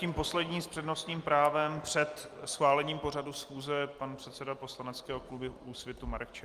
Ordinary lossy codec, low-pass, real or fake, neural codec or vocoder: Opus, 32 kbps; 14.4 kHz; real; none